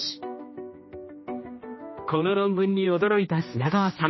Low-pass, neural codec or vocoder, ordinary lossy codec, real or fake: 7.2 kHz; codec, 16 kHz, 1 kbps, X-Codec, HuBERT features, trained on general audio; MP3, 24 kbps; fake